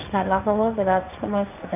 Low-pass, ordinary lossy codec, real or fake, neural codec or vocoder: 3.6 kHz; none; fake; codec, 16 kHz, 1.1 kbps, Voila-Tokenizer